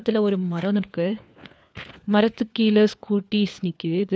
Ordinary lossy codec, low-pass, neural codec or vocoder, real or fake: none; none; codec, 16 kHz, 4 kbps, FunCodec, trained on LibriTTS, 50 frames a second; fake